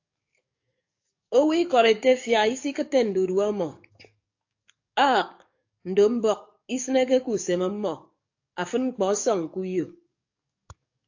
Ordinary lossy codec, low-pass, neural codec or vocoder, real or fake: AAC, 48 kbps; 7.2 kHz; codec, 44.1 kHz, 7.8 kbps, DAC; fake